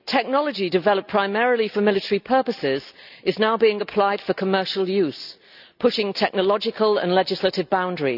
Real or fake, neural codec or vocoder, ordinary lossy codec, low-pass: real; none; none; 5.4 kHz